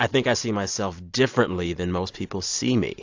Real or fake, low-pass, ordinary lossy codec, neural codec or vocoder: real; 7.2 kHz; AAC, 48 kbps; none